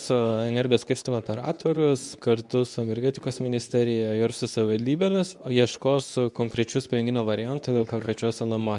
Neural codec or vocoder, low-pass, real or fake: codec, 24 kHz, 0.9 kbps, WavTokenizer, medium speech release version 2; 10.8 kHz; fake